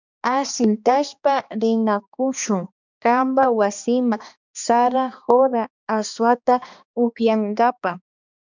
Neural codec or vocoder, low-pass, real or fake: codec, 16 kHz, 2 kbps, X-Codec, HuBERT features, trained on balanced general audio; 7.2 kHz; fake